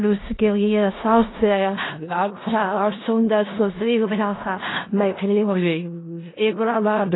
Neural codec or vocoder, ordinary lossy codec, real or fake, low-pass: codec, 16 kHz in and 24 kHz out, 0.4 kbps, LongCat-Audio-Codec, four codebook decoder; AAC, 16 kbps; fake; 7.2 kHz